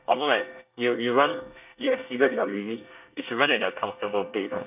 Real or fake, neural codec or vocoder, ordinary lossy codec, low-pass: fake; codec, 24 kHz, 1 kbps, SNAC; none; 3.6 kHz